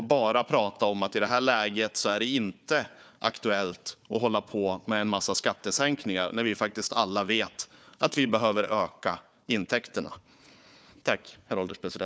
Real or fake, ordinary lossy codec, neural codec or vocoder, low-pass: fake; none; codec, 16 kHz, 4 kbps, FunCodec, trained on Chinese and English, 50 frames a second; none